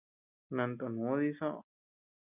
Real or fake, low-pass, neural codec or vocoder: real; 3.6 kHz; none